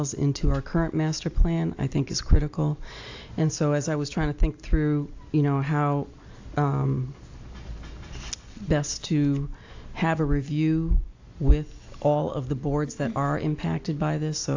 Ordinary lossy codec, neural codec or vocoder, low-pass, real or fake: AAC, 48 kbps; none; 7.2 kHz; real